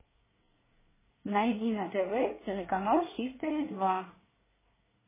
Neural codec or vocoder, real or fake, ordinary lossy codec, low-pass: codec, 24 kHz, 1 kbps, SNAC; fake; MP3, 16 kbps; 3.6 kHz